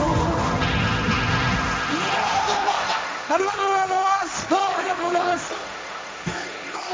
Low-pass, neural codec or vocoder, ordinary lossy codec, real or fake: none; codec, 16 kHz, 1.1 kbps, Voila-Tokenizer; none; fake